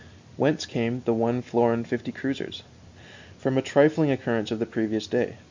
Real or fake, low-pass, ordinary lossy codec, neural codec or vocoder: real; 7.2 kHz; MP3, 64 kbps; none